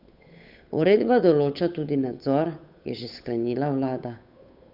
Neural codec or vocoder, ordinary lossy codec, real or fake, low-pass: codec, 16 kHz, 8 kbps, FunCodec, trained on Chinese and English, 25 frames a second; none; fake; 5.4 kHz